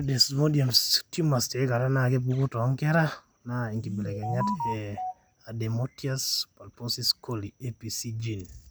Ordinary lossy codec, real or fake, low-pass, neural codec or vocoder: none; real; none; none